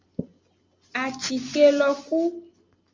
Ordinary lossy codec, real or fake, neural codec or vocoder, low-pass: Opus, 32 kbps; real; none; 7.2 kHz